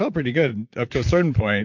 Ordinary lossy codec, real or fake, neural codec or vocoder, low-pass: MP3, 48 kbps; fake; vocoder, 44.1 kHz, 128 mel bands, Pupu-Vocoder; 7.2 kHz